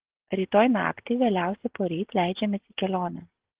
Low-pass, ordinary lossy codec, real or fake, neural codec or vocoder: 3.6 kHz; Opus, 16 kbps; real; none